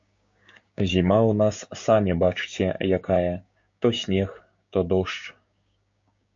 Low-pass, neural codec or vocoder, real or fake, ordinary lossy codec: 7.2 kHz; codec, 16 kHz, 6 kbps, DAC; fake; MP3, 48 kbps